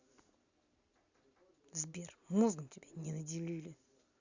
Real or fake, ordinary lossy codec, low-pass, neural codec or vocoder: real; Opus, 64 kbps; 7.2 kHz; none